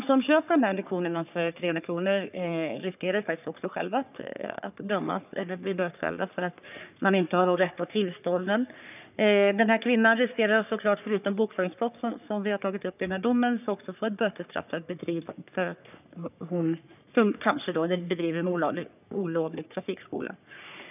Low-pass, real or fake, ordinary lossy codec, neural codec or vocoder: 3.6 kHz; fake; none; codec, 44.1 kHz, 3.4 kbps, Pupu-Codec